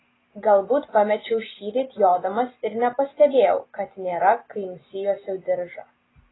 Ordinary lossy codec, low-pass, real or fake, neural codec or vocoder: AAC, 16 kbps; 7.2 kHz; real; none